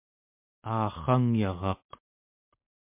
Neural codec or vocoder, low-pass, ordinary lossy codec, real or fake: none; 3.6 kHz; MP3, 32 kbps; real